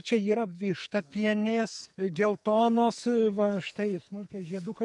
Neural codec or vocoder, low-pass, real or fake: codec, 44.1 kHz, 2.6 kbps, SNAC; 10.8 kHz; fake